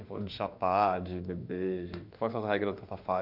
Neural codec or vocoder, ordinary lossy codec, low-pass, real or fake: autoencoder, 48 kHz, 32 numbers a frame, DAC-VAE, trained on Japanese speech; none; 5.4 kHz; fake